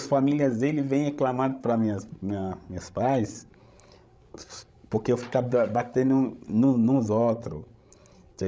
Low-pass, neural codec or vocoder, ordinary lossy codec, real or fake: none; codec, 16 kHz, 16 kbps, FreqCodec, larger model; none; fake